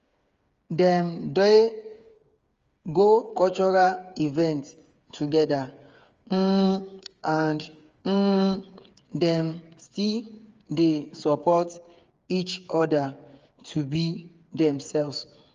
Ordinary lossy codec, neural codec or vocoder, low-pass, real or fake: Opus, 32 kbps; codec, 16 kHz, 8 kbps, FreqCodec, smaller model; 7.2 kHz; fake